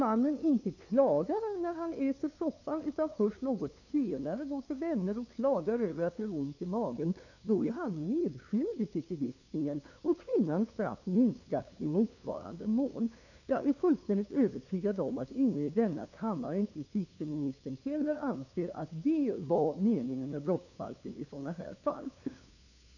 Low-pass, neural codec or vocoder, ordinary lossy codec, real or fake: 7.2 kHz; codec, 16 kHz, 2 kbps, FunCodec, trained on LibriTTS, 25 frames a second; none; fake